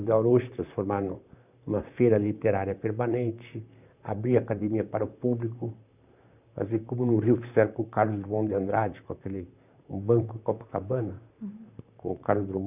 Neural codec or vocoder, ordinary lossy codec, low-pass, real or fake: vocoder, 44.1 kHz, 128 mel bands, Pupu-Vocoder; none; 3.6 kHz; fake